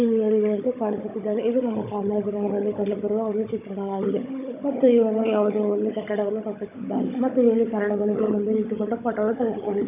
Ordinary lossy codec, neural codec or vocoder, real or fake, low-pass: none; codec, 16 kHz, 16 kbps, FunCodec, trained on Chinese and English, 50 frames a second; fake; 3.6 kHz